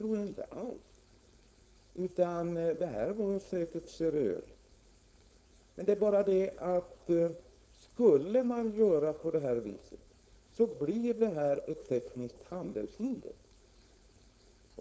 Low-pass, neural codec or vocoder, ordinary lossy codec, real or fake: none; codec, 16 kHz, 4.8 kbps, FACodec; none; fake